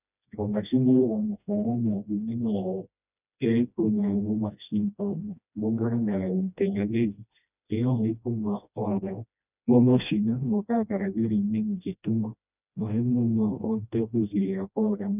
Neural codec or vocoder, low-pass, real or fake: codec, 16 kHz, 1 kbps, FreqCodec, smaller model; 3.6 kHz; fake